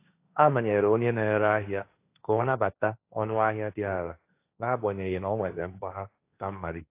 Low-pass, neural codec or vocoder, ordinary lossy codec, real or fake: 3.6 kHz; codec, 16 kHz, 1.1 kbps, Voila-Tokenizer; AAC, 24 kbps; fake